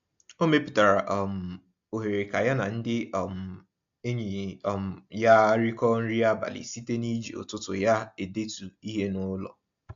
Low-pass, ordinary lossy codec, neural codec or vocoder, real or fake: 7.2 kHz; AAC, 64 kbps; none; real